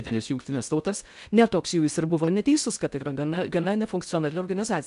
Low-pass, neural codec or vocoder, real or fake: 10.8 kHz; codec, 16 kHz in and 24 kHz out, 0.6 kbps, FocalCodec, streaming, 2048 codes; fake